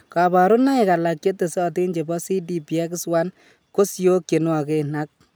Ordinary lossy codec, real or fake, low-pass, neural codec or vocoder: none; real; none; none